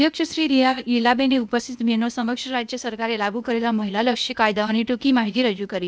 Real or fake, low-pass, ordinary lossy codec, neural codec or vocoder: fake; none; none; codec, 16 kHz, 0.8 kbps, ZipCodec